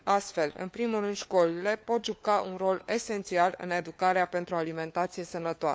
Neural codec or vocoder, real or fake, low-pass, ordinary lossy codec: codec, 16 kHz, 4 kbps, FunCodec, trained on LibriTTS, 50 frames a second; fake; none; none